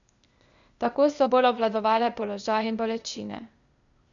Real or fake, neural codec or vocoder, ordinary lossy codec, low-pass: fake; codec, 16 kHz, 0.8 kbps, ZipCodec; none; 7.2 kHz